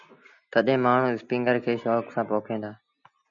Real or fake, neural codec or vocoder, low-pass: real; none; 7.2 kHz